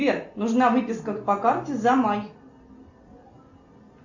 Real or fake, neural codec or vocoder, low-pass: real; none; 7.2 kHz